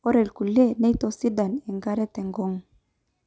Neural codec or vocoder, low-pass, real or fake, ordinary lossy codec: none; none; real; none